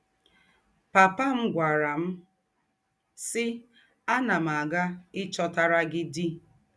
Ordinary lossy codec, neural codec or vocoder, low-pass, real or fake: none; none; none; real